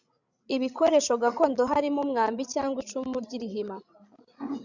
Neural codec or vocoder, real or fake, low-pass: codec, 16 kHz, 16 kbps, FreqCodec, larger model; fake; 7.2 kHz